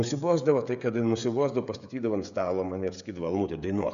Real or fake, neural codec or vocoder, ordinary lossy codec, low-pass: fake; codec, 16 kHz, 16 kbps, FreqCodec, smaller model; AAC, 96 kbps; 7.2 kHz